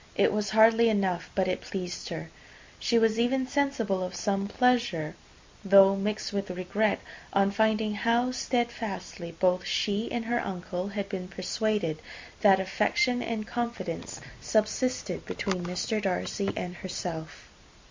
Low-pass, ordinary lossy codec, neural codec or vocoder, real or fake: 7.2 kHz; MP3, 48 kbps; none; real